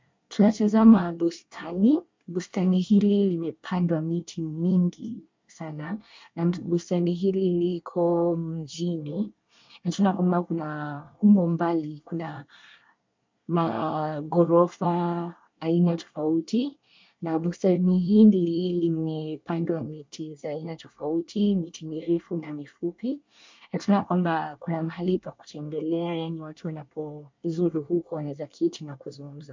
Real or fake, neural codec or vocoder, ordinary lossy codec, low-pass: fake; codec, 24 kHz, 1 kbps, SNAC; MP3, 64 kbps; 7.2 kHz